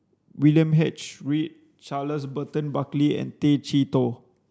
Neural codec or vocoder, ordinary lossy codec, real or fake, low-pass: none; none; real; none